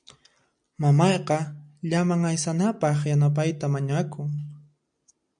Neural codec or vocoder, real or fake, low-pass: none; real; 9.9 kHz